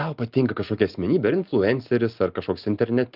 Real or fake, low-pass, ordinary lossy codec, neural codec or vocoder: real; 5.4 kHz; Opus, 24 kbps; none